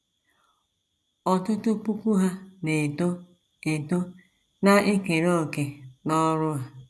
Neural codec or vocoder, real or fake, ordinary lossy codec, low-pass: none; real; none; none